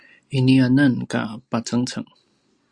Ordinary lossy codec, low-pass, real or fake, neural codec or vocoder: Opus, 64 kbps; 9.9 kHz; real; none